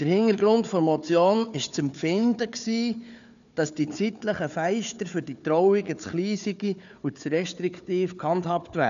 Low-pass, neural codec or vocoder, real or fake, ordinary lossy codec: 7.2 kHz; codec, 16 kHz, 4 kbps, FunCodec, trained on Chinese and English, 50 frames a second; fake; none